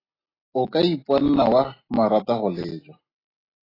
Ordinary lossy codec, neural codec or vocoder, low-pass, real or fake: AAC, 24 kbps; none; 5.4 kHz; real